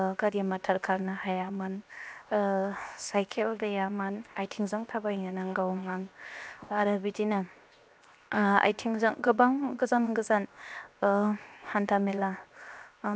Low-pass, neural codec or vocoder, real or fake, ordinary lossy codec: none; codec, 16 kHz, 0.7 kbps, FocalCodec; fake; none